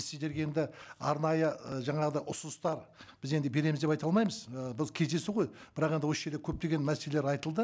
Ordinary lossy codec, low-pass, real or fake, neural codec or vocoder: none; none; real; none